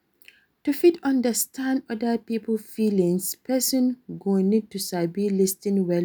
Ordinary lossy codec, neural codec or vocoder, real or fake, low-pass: none; none; real; none